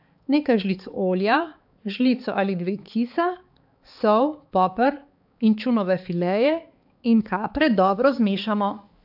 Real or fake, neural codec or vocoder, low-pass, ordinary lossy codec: fake; codec, 16 kHz, 4 kbps, X-Codec, HuBERT features, trained on balanced general audio; 5.4 kHz; AAC, 48 kbps